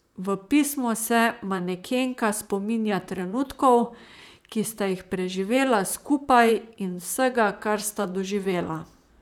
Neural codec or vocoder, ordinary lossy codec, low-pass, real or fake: vocoder, 44.1 kHz, 128 mel bands, Pupu-Vocoder; none; 19.8 kHz; fake